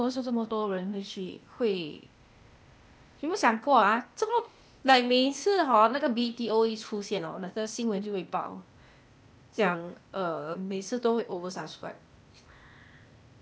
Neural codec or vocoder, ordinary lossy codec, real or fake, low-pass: codec, 16 kHz, 0.8 kbps, ZipCodec; none; fake; none